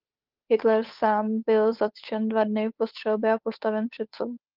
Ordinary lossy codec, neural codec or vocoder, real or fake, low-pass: Opus, 32 kbps; codec, 16 kHz, 8 kbps, FunCodec, trained on Chinese and English, 25 frames a second; fake; 5.4 kHz